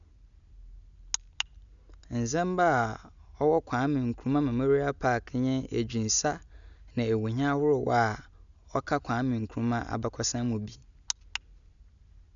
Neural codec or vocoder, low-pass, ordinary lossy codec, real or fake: none; 7.2 kHz; none; real